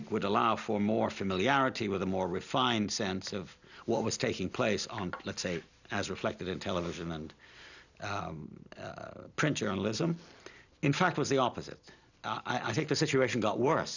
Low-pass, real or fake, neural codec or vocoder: 7.2 kHz; real; none